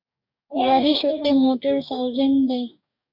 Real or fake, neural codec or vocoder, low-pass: fake; codec, 44.1 kHz, 2.6 kbps, DAC; 5.4 kHz